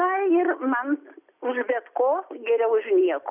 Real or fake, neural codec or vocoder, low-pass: real; none; 3.6 kHz